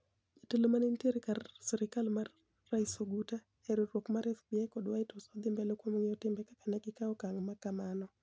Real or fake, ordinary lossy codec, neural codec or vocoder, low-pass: real; none; none; none